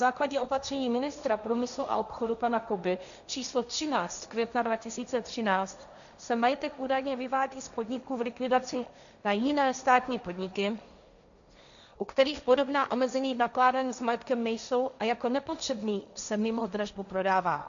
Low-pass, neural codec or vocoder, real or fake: 7.2 kHz; codec, 16 kHz, 1.1 kbps, Voila-Tokenizer; fake